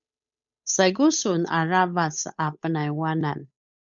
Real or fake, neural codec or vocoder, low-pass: fake; codec, 16 kHz, 8 kbps, FunCodec, trained on Chinese and English, 25 frames a second; 7.2 kHz